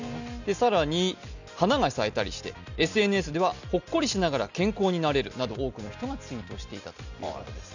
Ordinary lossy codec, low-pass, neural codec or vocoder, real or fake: none; 7.2 kHz; none; real